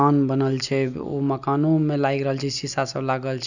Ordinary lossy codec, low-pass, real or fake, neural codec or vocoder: none; 7.2 kHz; real; none